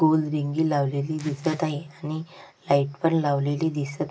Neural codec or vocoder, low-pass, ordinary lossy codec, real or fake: none; none; none; real